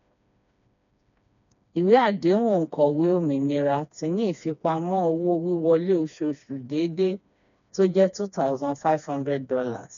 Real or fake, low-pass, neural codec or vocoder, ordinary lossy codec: fake; 7.2 kHz; codec, 16 kHz, 2 kbps, FreqCodec, smaller model; none